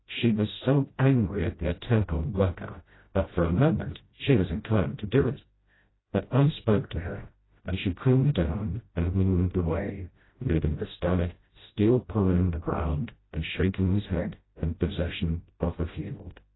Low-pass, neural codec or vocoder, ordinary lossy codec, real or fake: 7.2 kHz; codec, 16 kHz, 0.5 kbps, FreqCodec, smaller model; AAC, 16 kbps; fake